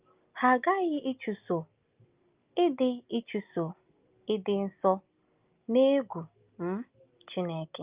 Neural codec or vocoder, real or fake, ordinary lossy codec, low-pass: none; real; Opus, 24 kbps; 3.6 kHz